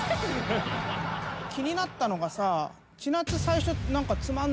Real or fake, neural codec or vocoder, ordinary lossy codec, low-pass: real; none; none; none